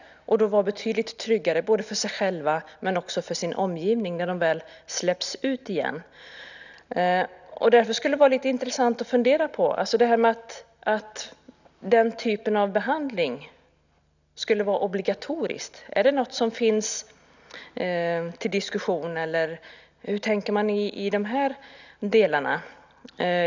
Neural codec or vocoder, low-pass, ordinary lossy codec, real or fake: none; 7.2 kHz; none; real